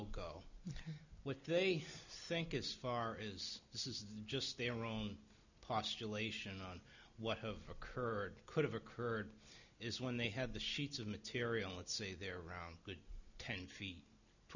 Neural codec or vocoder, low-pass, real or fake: none; 7.2 kHz; real